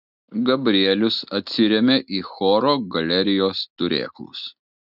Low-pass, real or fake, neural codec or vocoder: 5.4 kHz; real; none